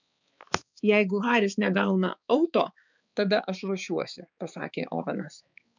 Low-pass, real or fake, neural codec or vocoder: 7.2 kHz; fake; codec, 16 kHz, 4 kbps, X-Codec, HuBERT features, trained on balanced general audio